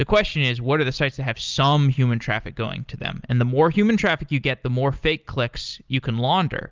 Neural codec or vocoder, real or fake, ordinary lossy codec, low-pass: none; real; Opus, 32 kbps; 7.2 kHz